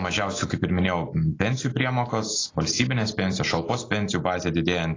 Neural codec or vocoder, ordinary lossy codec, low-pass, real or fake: none; AAC, 32 kbps; 7.2 kHz; real